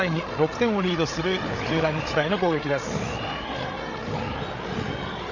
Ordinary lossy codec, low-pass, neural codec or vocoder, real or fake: none; 7.2 kHz; codec, 16 kHz, 8 kbps, FreqCodec, larger model; fake